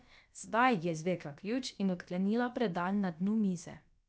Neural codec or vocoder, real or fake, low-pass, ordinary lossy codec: codec, 16 kHz, about 1 kbps, DyCAST, with the encoder's durations; fake; none; none